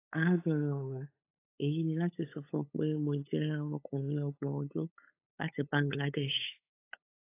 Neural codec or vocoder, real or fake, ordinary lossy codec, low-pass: codec, 16 kHz, 8 kbps, FunCodec, trained on LibriTTS, 25 frames a second; fake; AAC, 24 kbps; 3.6 kHz